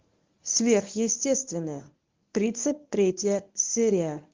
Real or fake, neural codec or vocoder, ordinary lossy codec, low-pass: fake; codec, 24 kHz, 0.9 kbps, WavTokenizer, medium speech release version 1; Opus, 32 kbps; 7.2 kHz